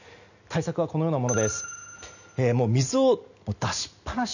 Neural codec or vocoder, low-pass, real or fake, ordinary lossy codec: none; 7.2 kHz; real; none